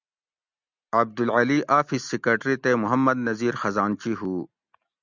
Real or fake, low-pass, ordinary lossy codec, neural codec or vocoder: real; 7.2 kHz; Opus, 64 kbps; none